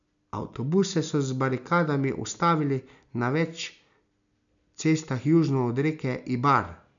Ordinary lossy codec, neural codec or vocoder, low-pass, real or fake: none; none; 7.2 kHz; real